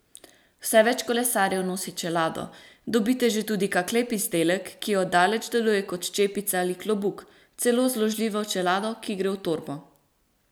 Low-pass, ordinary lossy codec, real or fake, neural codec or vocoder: none; none; real; none